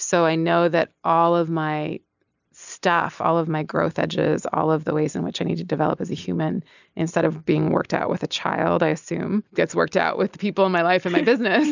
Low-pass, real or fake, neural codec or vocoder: 7.2 kHz; real; none